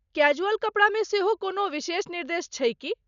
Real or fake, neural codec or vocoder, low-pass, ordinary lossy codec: real; none; 7.2 kHz; none